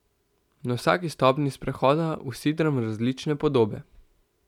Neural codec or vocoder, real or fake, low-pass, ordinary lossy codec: none; real; 19.8 kHz; none